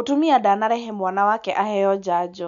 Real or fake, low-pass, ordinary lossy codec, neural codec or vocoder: real; 7.2 kHz; none; none